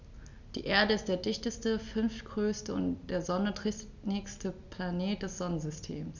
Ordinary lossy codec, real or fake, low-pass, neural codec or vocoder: none; real; 7.2 kHz; none